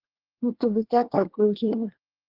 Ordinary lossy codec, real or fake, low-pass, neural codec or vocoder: Opus, 16 kbps; fake; 5.4 kHz; codec, 24 kHz, 1 kbps, SNAC